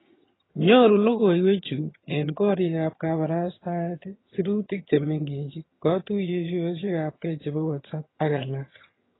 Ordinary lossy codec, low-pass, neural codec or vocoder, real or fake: AAC, 16 kbps; 7.2 kHz; vocoder, 22.05 kHz, 80 mel bands, HiFi-GAN; fake